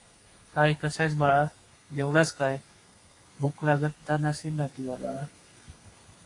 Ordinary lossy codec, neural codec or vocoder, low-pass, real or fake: AAC, 48 kbps; codec, 32 kHz, 1.9 kbps, SNAC; 10.8 kHz; fake